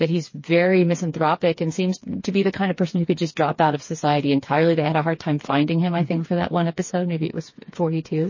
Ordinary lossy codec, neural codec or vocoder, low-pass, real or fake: MP3, 32 kbps; codec, 16 kHz, 4 kbps, FreqCodec, smaller model; 7.2 kHz; fake